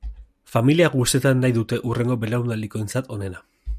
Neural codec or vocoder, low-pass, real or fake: none; 14.4 kHz; real